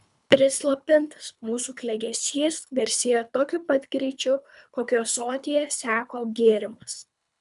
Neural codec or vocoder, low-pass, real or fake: codec, 24 kHz, 3 kbps, HILCodec; 10.8 kHz; fake